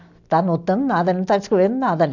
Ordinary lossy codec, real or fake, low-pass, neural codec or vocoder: none; real; 7.2 kHz; none